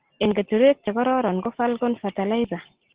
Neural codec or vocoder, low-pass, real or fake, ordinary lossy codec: none; 3.6 kHz; real; Opus, 16 kbps